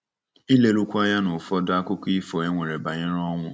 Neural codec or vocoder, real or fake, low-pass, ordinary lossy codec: none; real; none; none